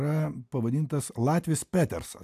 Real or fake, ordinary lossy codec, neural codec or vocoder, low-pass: real; AAC, 96 kbps; none; 14.4 kHz